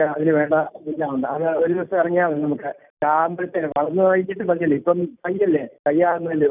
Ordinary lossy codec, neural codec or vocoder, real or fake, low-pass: none; none; real; 3.6 kHz